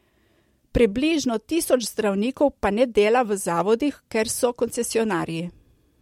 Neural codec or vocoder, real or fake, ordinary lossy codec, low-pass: none; real; MP3, 64 kbps; 19.8 kHz